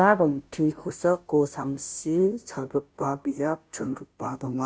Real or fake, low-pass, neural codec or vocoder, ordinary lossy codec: fake; none; codec, 16 kHz, 0.5 kbps, FunCodec, trained on Chinese and English, 25 frames a second; none